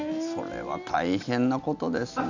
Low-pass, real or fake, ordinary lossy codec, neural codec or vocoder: 7.2 kHz; real; none; none